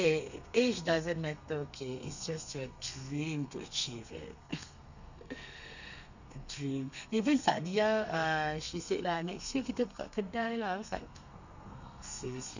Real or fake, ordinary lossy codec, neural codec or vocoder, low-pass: fake; none; codec, 32 kHz, 1.9 kbps, SNAC; 7.2 kHz